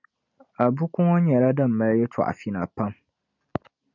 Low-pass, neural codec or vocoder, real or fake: 7.2 kHz; none; real